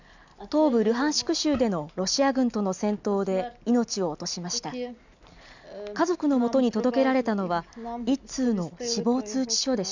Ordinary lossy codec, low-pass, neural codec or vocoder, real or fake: none; 7.2 kHz; none; real